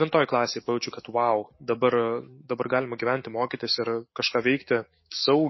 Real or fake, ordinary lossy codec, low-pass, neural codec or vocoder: fake; MP3, 24 kbps; 7.2 kHz; autoencoder, 48 kHz, 128 numbers a frame, DAC-VAE, trained on Japanese speech